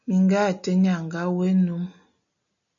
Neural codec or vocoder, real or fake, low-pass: none; real; 7.2 kHz